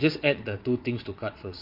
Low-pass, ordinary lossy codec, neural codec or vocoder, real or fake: 5.4 kHz; MP3, 32 kbps; vocoder, 22.05 kHz, 80 mel bands, WaveNeXt; fake